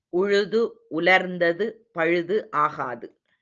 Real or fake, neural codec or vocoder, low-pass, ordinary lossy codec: real; none; 7.2 kHz; Opus, 24 kbps